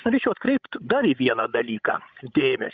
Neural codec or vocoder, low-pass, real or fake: codec, 16 kHz, 16 kbps, FreqCodec, larger model; 7.2 kHz; fake